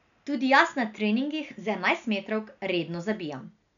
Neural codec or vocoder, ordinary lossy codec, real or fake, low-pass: none; none; real; 7.2 kHz